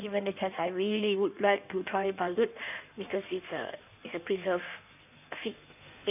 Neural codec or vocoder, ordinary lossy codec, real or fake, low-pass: codec, 16 kHz in and 24 kHz out, 1.1 kbps, FireRedTTS-2 codec; none; fake; 3.6 kHz